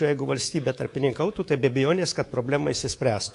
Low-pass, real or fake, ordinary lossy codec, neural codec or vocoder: 10.8 kHz; fake; AAC, 48 kbps; codec, 24 kHz, 3.1 kbps, DualCodec